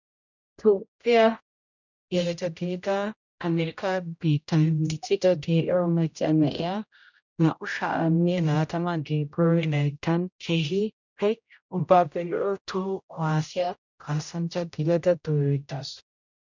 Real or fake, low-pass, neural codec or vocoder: fake; 7.2 kHz; codec, 16 kHz, 0.5 kbps, X-Codec, HuBERT features, trained on general audio